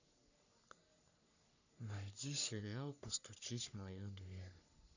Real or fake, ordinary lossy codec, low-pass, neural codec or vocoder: fake; none; 7.2 kHz; codec, 44.1 kHz, 3.4 kbps, Pupu-Codec